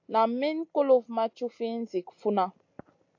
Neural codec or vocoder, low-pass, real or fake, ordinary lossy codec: none; 7.2 kHz; real; AAC, 48 kbps